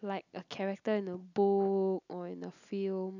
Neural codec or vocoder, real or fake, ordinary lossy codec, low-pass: none; real; none; 7.2 kHz